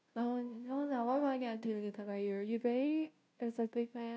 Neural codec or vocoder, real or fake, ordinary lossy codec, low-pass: codec, 16 kHz, 0.5 kbps, FunCodec, trained on Chinese and English, 25 frames a second; fake; none; none